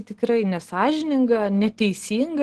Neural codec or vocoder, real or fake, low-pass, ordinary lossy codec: none; real; 10.8 kHz; Opus, 16 kbps